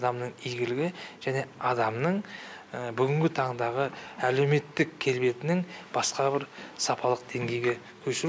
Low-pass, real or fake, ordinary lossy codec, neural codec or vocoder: none; real; none; none